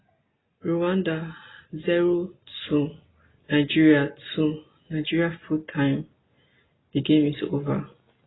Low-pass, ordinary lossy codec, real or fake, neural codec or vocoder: 7.2 kHz; AAC, 16 kbps; real; none